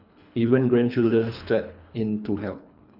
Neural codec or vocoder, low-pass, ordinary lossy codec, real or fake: codec, 24 kHz, 3 kbps, HILCodec; 5.4 kHz; none; fake